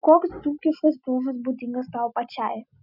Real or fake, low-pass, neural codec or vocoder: real; 5.4 kHz; none